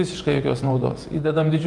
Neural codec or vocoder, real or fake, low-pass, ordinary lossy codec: vocoder, 48 kHz, 128 mel bands, Vocos; fake; 10.8 kHz; Opus, 32 kbps